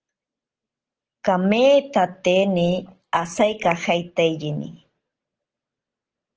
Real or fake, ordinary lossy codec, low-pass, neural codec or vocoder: real; Opus, 16 kbps; 7.2 kHz; none